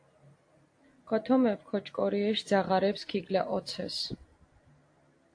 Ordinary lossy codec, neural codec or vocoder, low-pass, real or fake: AAC, 48 kbps; none; 9.9 kHz; real